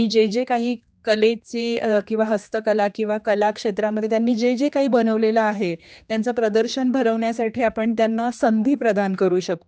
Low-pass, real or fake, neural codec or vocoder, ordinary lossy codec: none; fake; codec, 16 kHz, 2 kbps, X-Codec, HuBERT features, trained on general audio; none